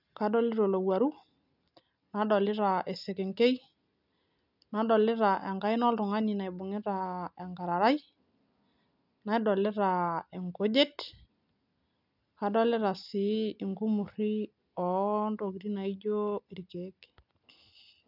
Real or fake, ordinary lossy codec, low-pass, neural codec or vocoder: real; none; 5.4 kHz; none